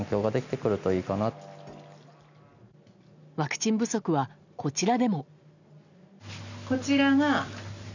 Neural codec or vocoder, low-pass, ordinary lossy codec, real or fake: none; 7.2 kHz; none; real